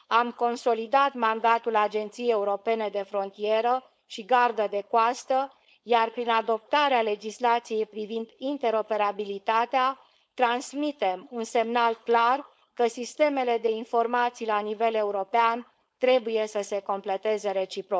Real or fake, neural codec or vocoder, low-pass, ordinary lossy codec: fake; codec, 16 kHz, 4.8 kbps, FACodec; none; none